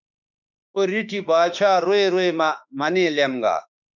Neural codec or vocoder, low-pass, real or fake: autoencoder, 48 kHz, 32 numbers a frame, DAC-VAE, trained on Japanese speech; 7.2 kHz; fake